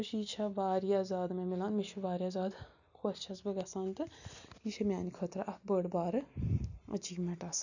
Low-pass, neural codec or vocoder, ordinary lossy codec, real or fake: 7.2 kHz; none; none; real